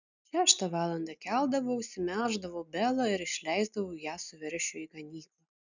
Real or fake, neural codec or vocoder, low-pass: real; none; 7.2 kHz